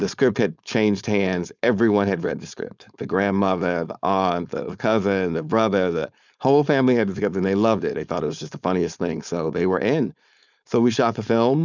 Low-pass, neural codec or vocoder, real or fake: 7.2 kHz; codec, 16 kHz, 4.8 kbps, FACodec; fake